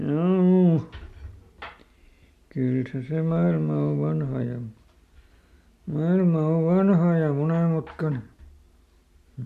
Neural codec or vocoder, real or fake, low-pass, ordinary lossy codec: none; real; 14.4 kHz; MP3, 64 kbps